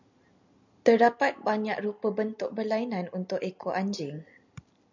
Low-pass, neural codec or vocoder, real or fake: 7.2 kHz; none; real